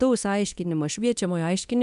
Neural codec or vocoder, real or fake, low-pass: codec, 24 kHz, 0.9 kbps, DualCodec; fake; 10.8 kHz